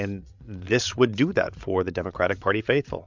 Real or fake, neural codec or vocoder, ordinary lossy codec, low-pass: fake; codec, 16 kHz, 8 kbps, FreqCodec, larger model; MP3, 64 kbps; 7.2 kHz